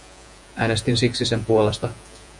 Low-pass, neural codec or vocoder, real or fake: 10.8 kHz; vocoder, 48 kHz, 128 mel bands, Vocos; fake